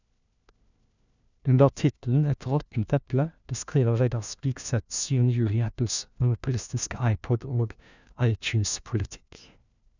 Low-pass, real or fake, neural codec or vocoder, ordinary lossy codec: 7.2 kHz; fake; codec, 16 kHz, 1 kbps, FunCodec, trained on LibriTTS, 50 frames a second; none